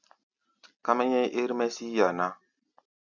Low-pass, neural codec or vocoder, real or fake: 7.2 kHz; none; real